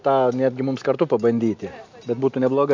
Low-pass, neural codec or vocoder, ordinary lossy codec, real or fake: 7.2 kHz; none; MP3, 64 kbps; real